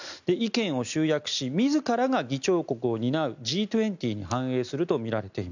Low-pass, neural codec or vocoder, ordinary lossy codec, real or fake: 7.2 kHz; none; none; real